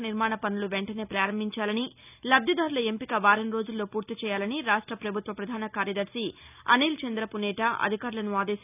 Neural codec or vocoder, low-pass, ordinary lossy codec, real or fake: none; 3.6 kHz; none; real